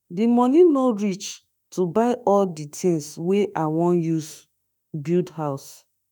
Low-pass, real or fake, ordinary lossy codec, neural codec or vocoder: none; fake; none; autoencoder, 48 kHz, 32 numbers a frame, DAC-VAE, trained on Japanese speech